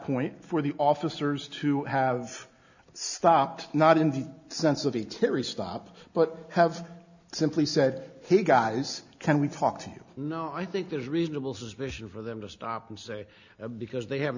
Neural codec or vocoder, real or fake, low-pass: none; real; 7.2 kHz